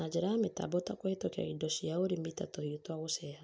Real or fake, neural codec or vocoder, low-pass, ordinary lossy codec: real; none; none; none